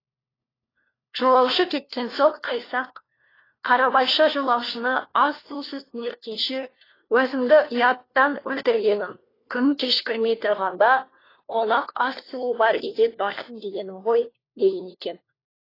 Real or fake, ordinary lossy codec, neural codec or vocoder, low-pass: fake; AAC, 24 kbps; codec, 16 kHz, 1 kbps, FunCodec, trained on LibriTTS, 50 frames a second; 5.4 kHz